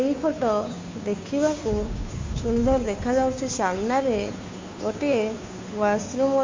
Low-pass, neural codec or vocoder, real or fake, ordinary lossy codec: 7.2 kHz; codec, 16 kHz, 2 kbps, FunCodec, trained on Chinese and English, 25 frames a second; fake; MP3, 48 kbps